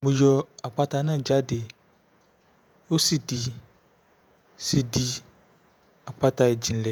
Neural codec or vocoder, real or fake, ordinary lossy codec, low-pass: vocoder, 48 kHz, 128 mel bands, Vocos; fake; none; none